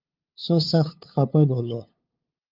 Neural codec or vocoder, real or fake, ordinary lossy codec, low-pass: codec, 16 kHz, 2 kbps, FunCodec, trained on LibriTTS, 25 frames a second; fake; Opus, 32 kbps; 5.4 kHz